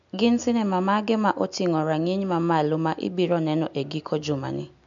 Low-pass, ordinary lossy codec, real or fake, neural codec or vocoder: 7.2 kHz; MP3, 64 kbps; real; none